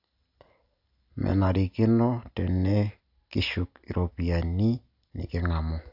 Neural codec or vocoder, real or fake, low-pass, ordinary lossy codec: none; real; 5.4 kHz; AAC, 48 kbps